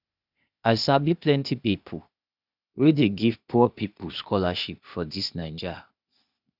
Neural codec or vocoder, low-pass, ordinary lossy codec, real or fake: codec, 16 kHz, 0.8 kbps, ZipCodec; 5.4 kHz; none; fake